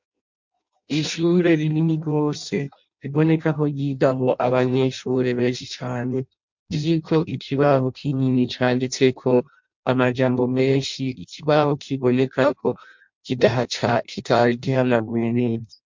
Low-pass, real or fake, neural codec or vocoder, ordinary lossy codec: 7.2 kHz; fake; codec, 16 kHz in and 24 kHz out, 0.6 kbps, FireRedTTS-2 codec; MP3, 64 kbps